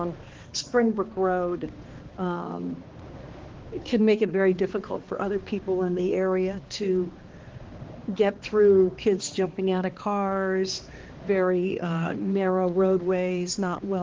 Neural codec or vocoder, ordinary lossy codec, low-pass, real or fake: codec, 16 kHz, 2 kbps, X-Codec, HuBERT features, trained on balanced general audio; Opus, 16 kbps; 7.2 kHz; fake